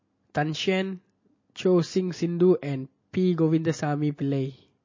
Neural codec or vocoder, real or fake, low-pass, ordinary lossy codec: none; real; 7.2 kHz; MP3, 32 kbps